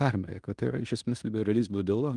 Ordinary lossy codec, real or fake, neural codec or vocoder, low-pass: Opus, 32 kbps; fake; codec, 16 kHz in and 24 kHz out, 0.9 kbps, LongCat-Audio-Codec, fine tuned four codebook decoder; 10.8 kHz